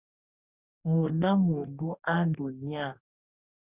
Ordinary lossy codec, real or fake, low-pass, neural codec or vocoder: Opus, 64 kbps; fake; 3.6 kHz; codec, 44.1 kHz, 2.6 kbps, DAC